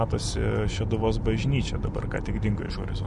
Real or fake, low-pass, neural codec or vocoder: real; 10.8 kHz; none